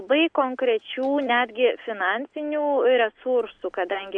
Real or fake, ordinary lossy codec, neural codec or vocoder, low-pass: real; AAC, 64 kbps; none; 9.9 kHz